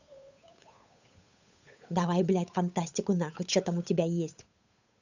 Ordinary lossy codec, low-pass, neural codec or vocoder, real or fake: none; 7.2 kHz; codec, 16 kHz, 8 kbps, FunCodec, trained on Chinese and English, 25 frames a second; fake